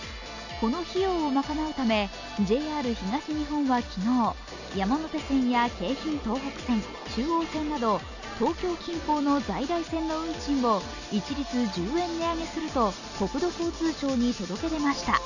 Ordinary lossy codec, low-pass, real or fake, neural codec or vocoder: none; 7.2 kHz; real; none